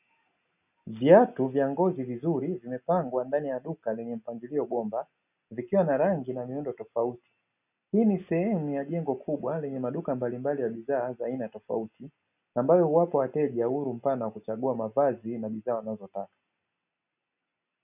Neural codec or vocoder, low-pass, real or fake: none; 3.6 kHz; real